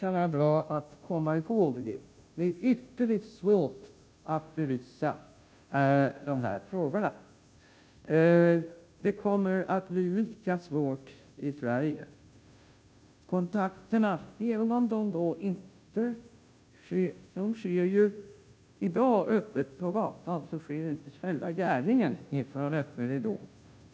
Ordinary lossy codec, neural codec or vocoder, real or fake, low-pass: none; codec, 16 kHz, 0.5 kbps, FunCodec, trained on Chinese and English, 25 frames a second; fake; none